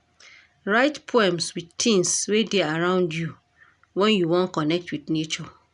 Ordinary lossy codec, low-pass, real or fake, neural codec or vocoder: none; 10.8 kHz; real; none